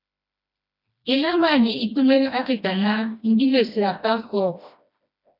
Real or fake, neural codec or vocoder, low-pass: fake; codec, 16 kHz, 1 kbps, FreqCodec, smaller model; 5.4 kHz